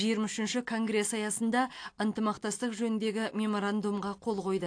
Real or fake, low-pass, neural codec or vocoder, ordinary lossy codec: real; 9.9 kHz; none; AAC, 48 kbps